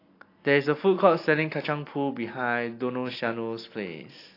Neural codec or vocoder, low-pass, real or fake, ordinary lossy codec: none; 5.4 kHz; real; AAC, 32 kbps